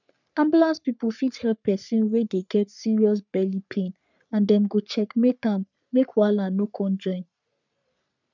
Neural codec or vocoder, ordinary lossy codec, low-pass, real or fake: codec, 44.1 kHz, 3.4 kbps, Pupu-Codec; none; 7.2 kHz; fake